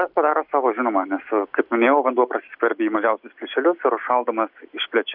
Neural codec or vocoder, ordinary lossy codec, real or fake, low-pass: none; AAC, 48 kbps; real; 5.4 kHz